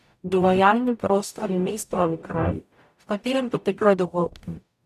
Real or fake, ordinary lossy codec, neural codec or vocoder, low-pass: fake; none; codec, 44.1 kHz, 0.9 kbps, DAC; 14.4 kHz